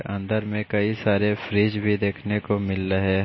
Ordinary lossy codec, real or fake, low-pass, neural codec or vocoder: MP3, 24 kbps; real; 7.2 kHz; none